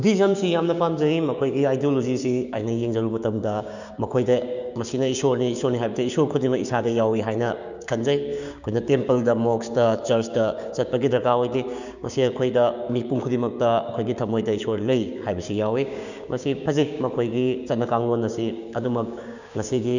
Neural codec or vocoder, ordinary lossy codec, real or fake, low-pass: codec, 16 kHz, 6 kbps, DAC; none; fake; 7.2 kHz